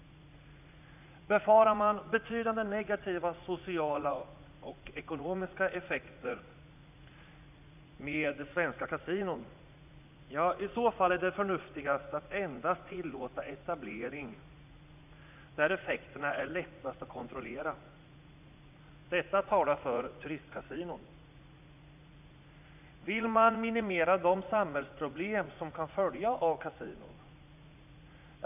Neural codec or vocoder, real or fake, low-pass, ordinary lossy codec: vocoder, 44.1 kHz, 80 mel bands, Vocos; fake; 3.6 kHz; none